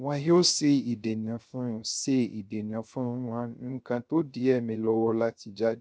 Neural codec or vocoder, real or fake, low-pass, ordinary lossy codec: codec, 16 kHz, 0.3 kbps, FocalCodec; fake; none; none